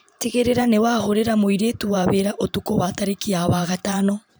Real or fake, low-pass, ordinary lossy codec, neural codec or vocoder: fake; none; none; vocoder, 44.1 kHz, 128 mel bands every 512 samples, BigVGAN v2